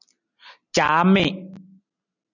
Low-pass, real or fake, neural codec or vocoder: 7.2 kHz; real; none